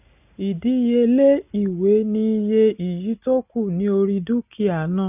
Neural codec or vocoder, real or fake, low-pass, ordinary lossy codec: none; real; 3.6 kHz; none